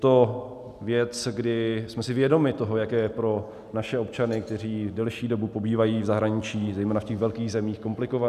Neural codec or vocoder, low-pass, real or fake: none; 14.4 kHz; real